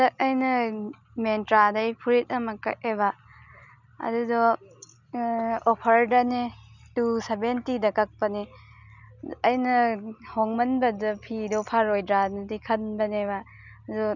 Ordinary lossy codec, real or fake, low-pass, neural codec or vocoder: none; real; 7.2 kHz; none